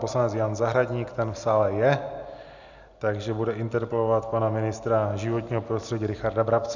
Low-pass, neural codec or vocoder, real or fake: 7.2 kHz; none; real